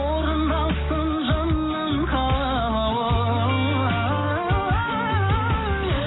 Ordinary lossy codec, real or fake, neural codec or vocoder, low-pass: AAC, 16 kbps; real; none; 7.2 kHz